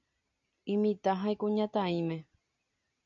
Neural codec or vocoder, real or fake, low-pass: none; real; 7.2 kHz